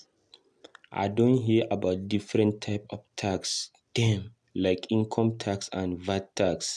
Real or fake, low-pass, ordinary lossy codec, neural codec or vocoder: real; none; none; none